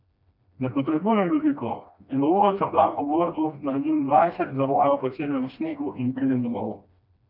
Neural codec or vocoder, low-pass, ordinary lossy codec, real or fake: codec, 16 kHz, 1 kbps, FreqCodec, smaller model; 5.4 kHz; none; fake